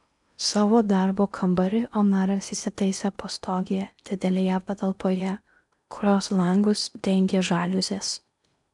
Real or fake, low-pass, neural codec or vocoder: fake; 10.8 kHz; codec, 16 kHz in and 24 kHz out, 0.8 kbps, FocalCodec, streaming, 65536 codes